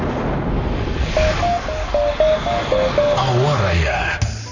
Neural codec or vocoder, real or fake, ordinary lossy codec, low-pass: codec, 44.1 kHz, 7.8 kbps, Pupu-Codec; fake; none; 7.2 kHz